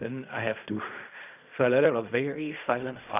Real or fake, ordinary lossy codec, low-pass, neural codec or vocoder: fake; none; 3.6 kHz; codec, 16 kHz in and 24 kHz out, 0.4 kbps, LongCat-Audio-Codec, fine tuned four codebook decoder